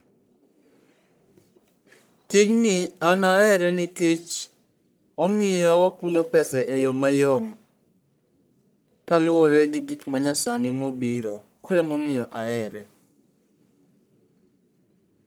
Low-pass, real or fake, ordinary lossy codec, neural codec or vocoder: none; fake; none; codec, 44.1 kHz, 1.7 kbps, Pupu-Codec